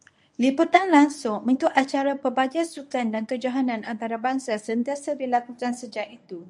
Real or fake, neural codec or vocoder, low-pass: fake; codec, 24 kHz, 0.9 kbps, WavTokenizer, medium speech release version 1; 10.8 kHz